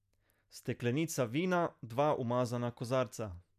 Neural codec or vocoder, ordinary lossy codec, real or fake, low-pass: none; none; real; 14.4 kHz